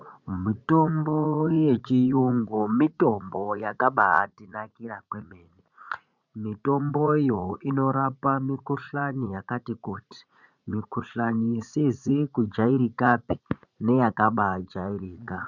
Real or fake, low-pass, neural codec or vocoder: fake; 7.2 kHz; vocoder, 44.1 kHz, 80 mel bands, Vocos